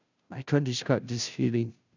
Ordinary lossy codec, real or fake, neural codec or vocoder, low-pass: none; fake; codec, 16 kHz, 0.5 kbps, FunCodec, trained on Chinese and English, 25 frames a second; 7.2 kHz